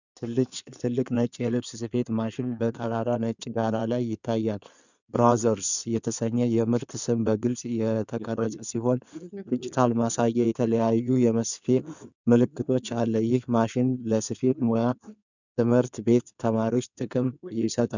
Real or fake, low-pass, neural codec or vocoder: fake; 7.2 kHz; codec, 16 kHz in and 24 kHz out, 2.2 kbps, FireRedTTS-2 codec